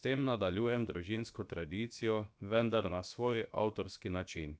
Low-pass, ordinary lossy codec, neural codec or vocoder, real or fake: none; none; codec, 16 kHz, about 1 kbps, DyCAST, with the encoder's durations; fake